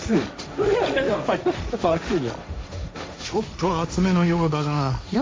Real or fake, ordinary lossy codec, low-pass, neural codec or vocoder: fake; none; none; codec, 16 kHz, 1.1 kbps, Voila-Tokenizer